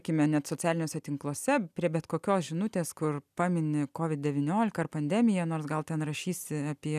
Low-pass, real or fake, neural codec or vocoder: 14.4 kHz; real; none